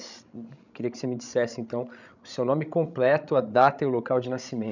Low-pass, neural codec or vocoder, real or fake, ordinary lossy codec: 7.2 kHz; codec, 16 kHz, 16 kbps, FreqCodec, larger model; fake; none